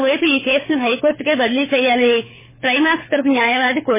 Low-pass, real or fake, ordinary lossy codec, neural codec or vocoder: 3.6 kHz; fake; MP3, 16 kbps; codec, 24 kHz, 6 kbps, HILCodec